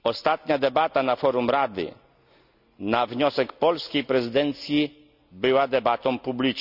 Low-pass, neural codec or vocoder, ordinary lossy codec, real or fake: 5.4 kHz; none; none; real